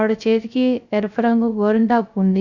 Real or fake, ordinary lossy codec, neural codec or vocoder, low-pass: fake; none; codec, 16 kHz, 0.3 kbps, FocalCodec; 7.2 kHz